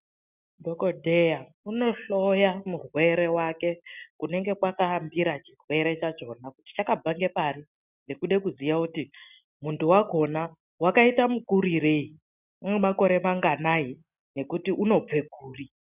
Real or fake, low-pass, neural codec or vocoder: real; 3.6 kHz; none